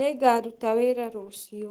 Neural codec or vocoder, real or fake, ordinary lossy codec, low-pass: codec, 44.1 kHz, 7.8 kbps, Pupu-Codec; fake; Opus, 16 kbps; 19.8 kHz